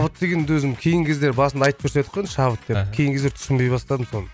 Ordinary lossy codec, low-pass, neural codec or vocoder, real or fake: none; none; none; real